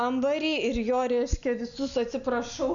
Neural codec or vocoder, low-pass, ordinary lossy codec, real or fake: none; 7.2 kHz; AAC, 64 kbps; real